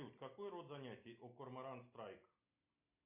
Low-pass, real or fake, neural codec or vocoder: 3.6 kHz; real; none